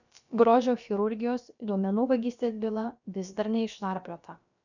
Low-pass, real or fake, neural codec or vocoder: 7.2 kHz; fake; codec, 16 kHz, about 1 kbps, DyCAST, with the encoder's durations